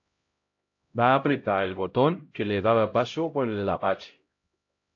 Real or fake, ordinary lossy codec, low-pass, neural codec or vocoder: fake; AAC, 48 kbps; 7.2 kHz; codec, 16 kHz, 0.5 kbps, X-Codec, HuBERT features, trained on LibriSpeech